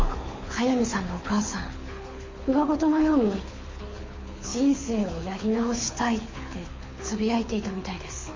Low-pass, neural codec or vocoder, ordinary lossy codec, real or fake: 7.2 kHz; codec, 24 kHz, 6 kbps, HILCodec; MP3, 32 kbps; fake